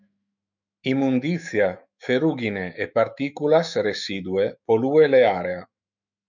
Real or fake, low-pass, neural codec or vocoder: fake; 7.2 kHz; autoencoder, 48 kHz, 128 numbers a frame, DAC-VAE, trained on Japanese speech